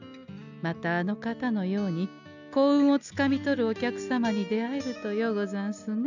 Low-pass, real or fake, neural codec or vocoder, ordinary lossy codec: 7.2 kHz; real; none; none